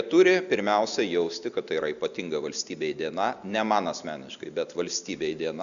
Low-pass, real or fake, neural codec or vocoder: 7.2 kHz; real; none